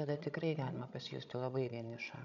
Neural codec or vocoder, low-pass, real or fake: codec, 16 kHz, 16 kbps, FreqCodec, larger model; 7.2 kHz; fake